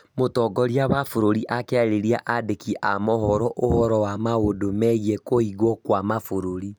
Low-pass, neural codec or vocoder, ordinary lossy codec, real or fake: none; none; none; real